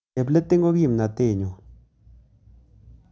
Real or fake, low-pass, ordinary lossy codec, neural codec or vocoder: real; none; none; none